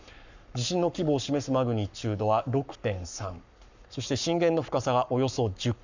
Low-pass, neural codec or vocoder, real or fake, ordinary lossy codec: 7.2 kHz; codec, 44.1 kHz, 7.8 kbps, Pupu-Codec; fake; none